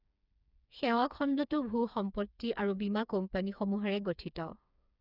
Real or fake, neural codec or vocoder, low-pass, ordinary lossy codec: fake; codec, 16 kHz, 4 kbps, FreqCodec, smaller model; 5.4 kHz; none